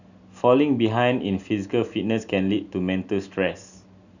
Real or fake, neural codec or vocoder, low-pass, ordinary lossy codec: real; none; 7.2 kHz; none